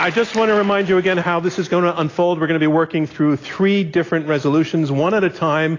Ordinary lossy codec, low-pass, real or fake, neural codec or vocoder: AAC, 32 kbps; 7.2 kHz; real; none